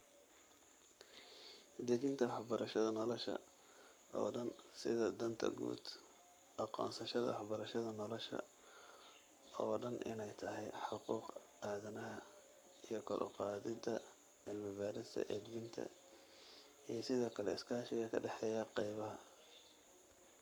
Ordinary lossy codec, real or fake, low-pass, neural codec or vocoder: none; fake; none; codec, 44.1 kHz, 7.8 kbps, Pupu-Codec